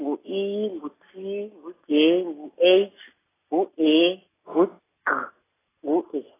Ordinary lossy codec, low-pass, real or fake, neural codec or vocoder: AAC, 16 kbps; 3.6 kHz; real; none